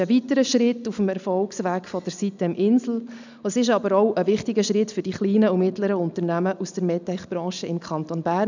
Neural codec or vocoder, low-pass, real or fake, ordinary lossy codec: none; 7.2 kHz; real; none